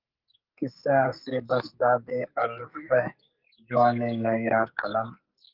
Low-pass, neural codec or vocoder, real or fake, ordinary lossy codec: 5.4 kHz; codec, 44.1 kHz, 2.6 kbps, SNAC; fake; Opus, 32 kbps